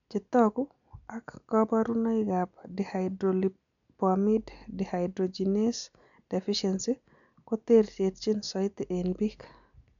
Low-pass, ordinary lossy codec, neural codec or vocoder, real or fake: 7.2 kHz; none; none; real